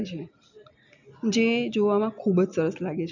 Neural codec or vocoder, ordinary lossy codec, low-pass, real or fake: none; none; 7.2 kHz; real